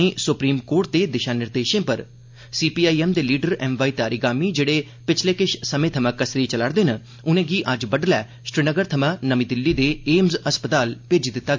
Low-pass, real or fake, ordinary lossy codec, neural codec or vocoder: 7.2 kHz; real; MP3, 48 kbps; none